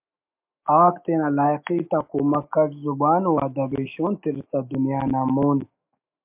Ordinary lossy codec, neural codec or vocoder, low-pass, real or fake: MP3, 32 kbps; autoencoder, 48 kHz, 128 numbers a frame, DAC-VAE, trained on Japanese speech; 3.6 kHz; fake